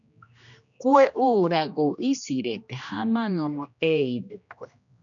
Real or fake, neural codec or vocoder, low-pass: fake; codec, 16 kHz, 1 kbps, X-Codec, HuBERT features, trained on general audio; 7.2 kHz